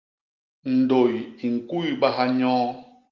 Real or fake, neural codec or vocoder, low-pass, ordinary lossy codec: real; none; 7.2 kHz; Opus, 24 kbps